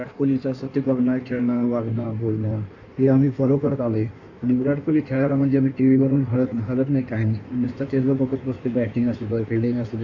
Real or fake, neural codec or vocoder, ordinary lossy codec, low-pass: fake; codec, 16 kHz in and 24 kHz out, 1.1 kbps, FireRedTTS-2 codec; none; 7.2 kHz